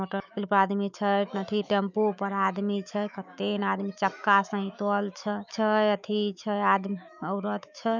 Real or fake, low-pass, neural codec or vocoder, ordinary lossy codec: fake; 7.2 kHz; autoencoder, 48 kHz, 128 numbers a frame, DAC-VAE, trained on Japanese speech; none